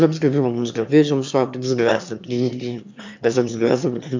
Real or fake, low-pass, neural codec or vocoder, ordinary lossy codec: fake; 7.2 kHz; autoencoder, 22.05 kHz, a latent of 192 numbers a frame, VITS, trained on one speaker; MP3, 64 kbps